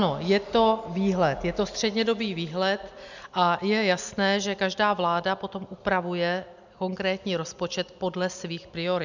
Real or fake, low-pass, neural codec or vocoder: real; 7.2 kHz; none